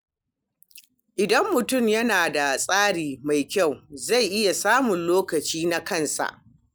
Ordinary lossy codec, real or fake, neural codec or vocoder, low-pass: none; real; none; none